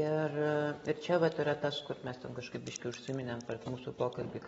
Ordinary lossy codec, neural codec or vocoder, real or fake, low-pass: AAC, 24 kbps; none; real; 19.8 kHz